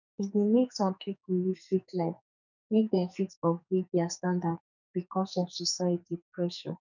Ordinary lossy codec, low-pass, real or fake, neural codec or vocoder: none; 7.2 kHz; fake; codec, 44.1 kHz, 2.6 kbps, SNAC